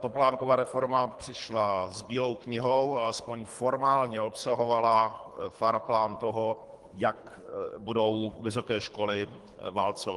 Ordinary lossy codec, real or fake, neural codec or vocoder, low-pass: Opus, 24 kbps; fake; codec, 24 kHz, 3 kbps, HILCodec; 10.8 kHz